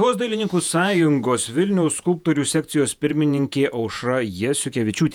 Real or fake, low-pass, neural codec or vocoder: fake; 19.8 kHz; vocoder, 48 kHz, 128 mel bands, Vocos